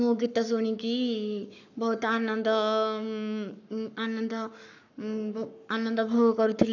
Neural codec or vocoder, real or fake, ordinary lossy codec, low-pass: codec, 44.1 kHz, 7.8 kbps, Pupu-Codec; fake; none; 7.2 kHz